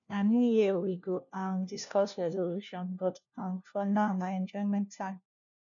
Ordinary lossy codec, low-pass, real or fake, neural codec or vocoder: none; 7.2 kHz; fake; codec, 16 kHz, 1 kbps, FunCodec, trained on LibriTTS, 50 frames a second